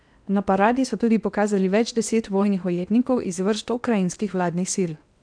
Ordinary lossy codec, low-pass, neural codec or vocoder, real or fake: none; 9.9 kHz; codec, 16 kHz in and 24 kHz out, 0.8 kbps, FocalCodec, streaming, 65536 codes; fake